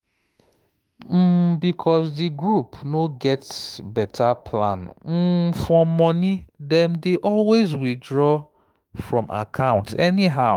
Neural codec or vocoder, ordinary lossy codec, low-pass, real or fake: autoencoder, 48 kHz, 32 numbers a frame, DAC-VAE, trained on Japanese speech; Opus, 32 kbps; 19.8 kHz; fake